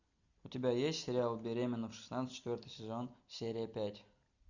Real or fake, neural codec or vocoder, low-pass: real; none; 7.2 kHz